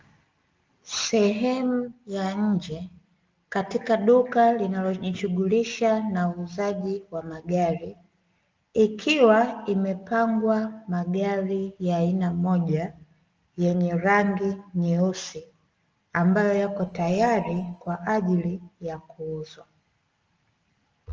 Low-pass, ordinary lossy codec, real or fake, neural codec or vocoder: 7.2 kHz; Opus, 24 kbps; real; none